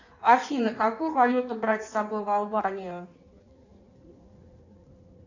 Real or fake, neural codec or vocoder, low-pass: fake; codec, 16 kHz in and 24 kHz out, 1.1 kbps, FireRedTTS-2 codec; 7.2 kHz